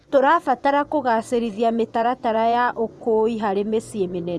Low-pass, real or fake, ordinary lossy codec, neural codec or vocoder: none; fake; none; vocoder, 24 kHz, 100 mel bands, Vocos